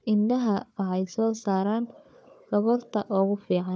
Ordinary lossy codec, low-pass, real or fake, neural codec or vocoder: none; none; fake; codec, 16 kHz, 4 kbps, FunCodec, trained on Chinese and English, 50 frames a second